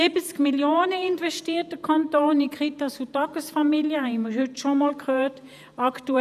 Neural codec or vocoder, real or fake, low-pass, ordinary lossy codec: vocoder, 48 kHz, 128 mel bands, Vocos; fake; 14.4 kHz; none